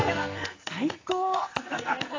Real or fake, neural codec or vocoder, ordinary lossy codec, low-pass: fake; codec, 44.1 kHz, 2.6 kbps, SNAC; AAC, 32 kbps; 7.2 kHz